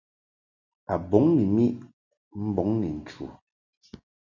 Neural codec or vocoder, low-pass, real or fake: none; 7.2 kHz; real